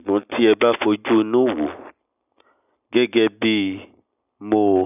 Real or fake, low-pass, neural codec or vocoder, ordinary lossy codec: real; 3.6 kHz; none; none